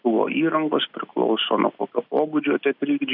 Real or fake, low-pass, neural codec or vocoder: real; 5.4 kHz; none